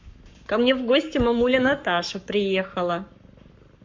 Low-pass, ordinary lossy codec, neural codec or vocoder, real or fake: 7.2 kHz; MP3, 48 kbps; codec, 44.1 kHz, 7.8 kbps, Pupu-Codec; fake